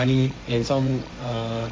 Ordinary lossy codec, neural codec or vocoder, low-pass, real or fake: none; codec, 16 kHz, 1.1 kbps, Voila-Tokenizer; none; fake